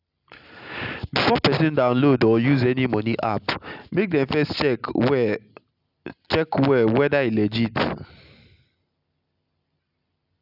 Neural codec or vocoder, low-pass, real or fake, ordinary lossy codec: none; 5.4 kHz; real; none